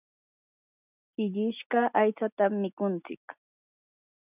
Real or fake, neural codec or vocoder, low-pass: real; none; 3.6 kHz